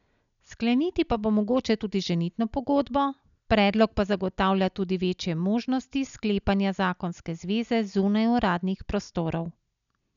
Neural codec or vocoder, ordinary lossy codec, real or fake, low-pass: none; MP3, 96 kbps; real; 7.2 kHz